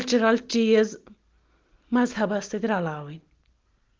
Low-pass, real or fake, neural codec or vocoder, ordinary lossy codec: 7.2 kHz; real; none; Opus, 16 kbps